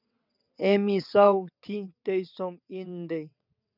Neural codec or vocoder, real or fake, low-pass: vocoder, 22.05 kHz, 80 mel bands, WaveNeXt; fake; 5.4 kHz